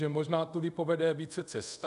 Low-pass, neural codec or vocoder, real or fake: 10.8 kHz; codec, 24 kHz, 0.5 kbps, DualCodec; fake